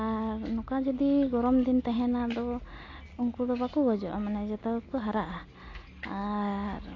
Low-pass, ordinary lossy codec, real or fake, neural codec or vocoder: 7.2 kHz; AAC, 32 kbps; real; none